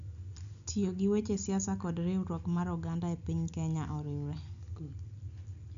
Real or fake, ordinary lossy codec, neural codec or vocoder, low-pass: real; none; none; 7.2 kHz